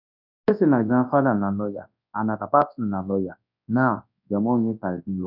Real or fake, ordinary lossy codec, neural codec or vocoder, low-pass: fake; none; codec, 24 kHz, 0.9 kbps, WavTokenizer, large speech release; 5.4 kHz